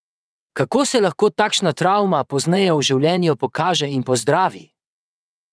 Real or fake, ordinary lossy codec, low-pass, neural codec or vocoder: fake; none; none; vocoder, 22.05 kHz, 80 mel bands, WaveNeXt